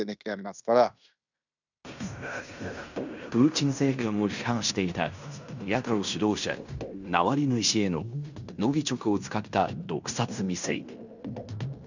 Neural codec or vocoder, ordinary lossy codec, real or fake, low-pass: codec, 16 kHz in and 24 kHz out, 0.9 kbps, LongCat-Audio-Codec, fine tuned four codebook decoder; none; fake; 7.2 kHz